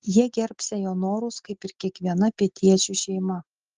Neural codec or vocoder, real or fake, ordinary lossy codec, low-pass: none; real; Opus, 16 kbps; 7.2 kHz